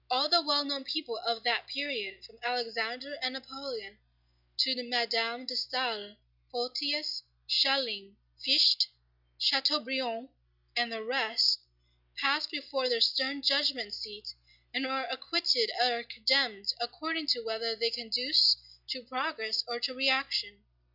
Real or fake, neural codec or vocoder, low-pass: real; none; 5.4 kHz